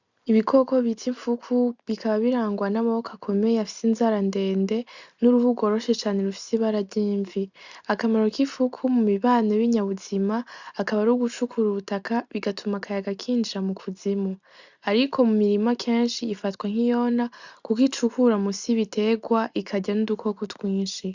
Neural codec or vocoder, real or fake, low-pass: none; real; 7.2 kHz